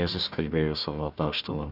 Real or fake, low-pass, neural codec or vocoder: fake; 5.4 kHz; codec, 16 kHz, 1 kbps, FunCodec, trained on Chinese and English, 50 frames a second